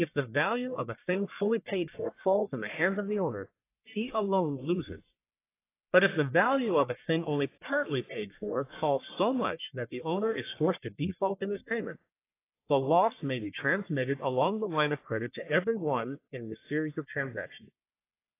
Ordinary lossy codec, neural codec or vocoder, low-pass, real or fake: AAC, 24 kbps; codec, 44.1 kHz, 1.7 kbps, Pupu-Codec; 3.6 kHz; fake